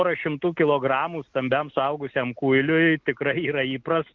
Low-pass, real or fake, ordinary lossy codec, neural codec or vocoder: 7.2 kHz; real; Opus, 32 kbps; none